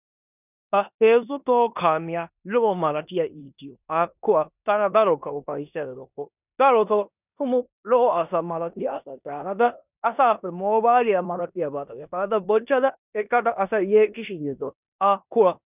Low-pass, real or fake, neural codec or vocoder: 3.6 kHz; fake; codec, 16 kHz in and 24 kHz out, 0.9 kbps, LongCat-Audio-Codec, four codebook decoder